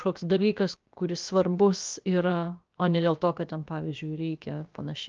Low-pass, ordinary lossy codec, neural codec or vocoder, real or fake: 7.2 kHz; Opus, 24 kbps; codec, 16 kHz, about 1 kbps, DyCAST, with the encoder's durations; fake